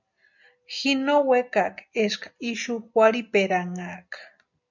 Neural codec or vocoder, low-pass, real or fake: none; 7.2 kHz; real